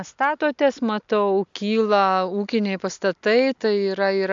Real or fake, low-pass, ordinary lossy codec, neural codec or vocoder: real; 7.2 kHz; AAC, 64 kbps; none